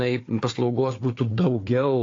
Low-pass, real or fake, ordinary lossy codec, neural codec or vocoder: 7.2 kHz; fake; AAC, 32 kbps; codec, 16 kHz, 4 kbps, FunCodec, trained on Chinese and English, 50 frames a second